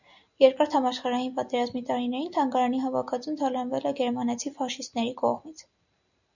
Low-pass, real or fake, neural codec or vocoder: 7.2 kHz; real; none